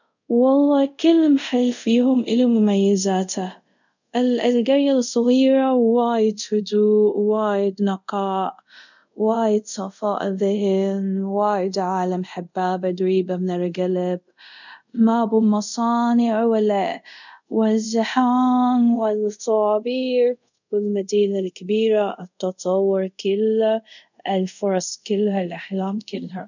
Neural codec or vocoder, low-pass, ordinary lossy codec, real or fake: codec, 24 kHz, 0.5 kbps, DualCodec; 7.2 kHz; none; fake